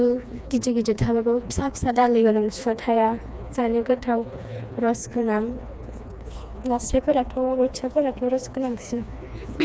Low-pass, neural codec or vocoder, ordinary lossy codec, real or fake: none; codec, 16 kHz, 2 kbps, FreqCodec, smaller model; none; fake